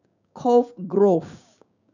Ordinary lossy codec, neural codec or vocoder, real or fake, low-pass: none; codec, 16 kHz, 6 kbps, DAC; fake; 7.2 kHz